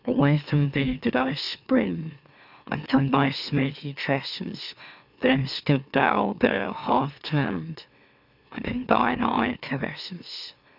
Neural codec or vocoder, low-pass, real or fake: autoencoder, 44.1 kHz, a latent of 192 numbers a frame, MeloTTS; 5.4 kHz; fake